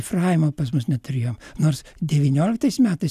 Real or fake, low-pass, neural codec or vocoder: real; 14.4 kHz; none